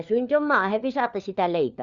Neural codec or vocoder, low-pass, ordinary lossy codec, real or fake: codec, 16 kHz, 4 kbps, FunCodec, trained on LibriTTS, 50 frames a second; 7.2 kHz; Opus, 64 kbps; fake